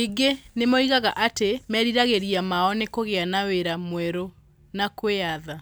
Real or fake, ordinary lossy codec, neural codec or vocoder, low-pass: real; none; none; none